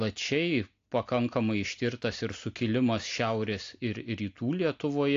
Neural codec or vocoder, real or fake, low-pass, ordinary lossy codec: none; real; 7.2 kHz; AAC, 48 kbps